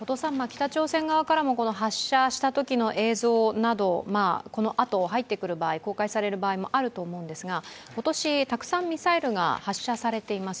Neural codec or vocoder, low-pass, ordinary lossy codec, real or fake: none; none; none; real